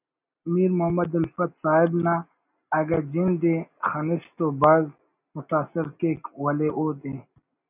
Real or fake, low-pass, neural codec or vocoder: real; 3.6 kHz; none